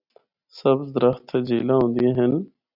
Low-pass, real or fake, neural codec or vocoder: 5.4 kHz; real; none